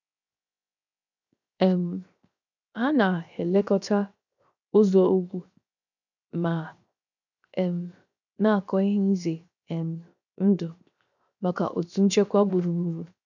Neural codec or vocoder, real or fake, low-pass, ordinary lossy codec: codec, 16 kHz, 0.7 kbps, FocalCodec; fake; 7.2 kHz; none